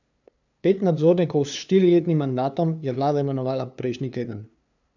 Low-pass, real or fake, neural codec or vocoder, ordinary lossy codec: 7.2 kHz; fake; codec, 16 kHz, 2 kbps, FunCodec, trained on LibriTTS, 25 frames a second; none